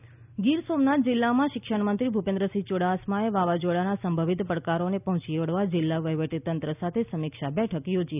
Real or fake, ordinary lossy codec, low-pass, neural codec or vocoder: real; none; 3.6 kHz; none